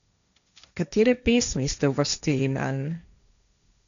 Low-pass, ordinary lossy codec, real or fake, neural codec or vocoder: 7.2 kHz; none; fake; codec, 16 kHz, 1.1 kbps, Voila-Tokenizer